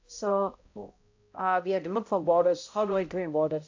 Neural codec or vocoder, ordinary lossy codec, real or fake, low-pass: codec, 16 kHz, 0.5 kbps, X-Codec, HuBERT features, trained on balanced general audio; AAC, 48 kbps; fake; 7.2 kHz